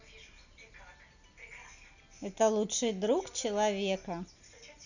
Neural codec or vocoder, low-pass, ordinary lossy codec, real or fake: none; 7.2 kHz; none; real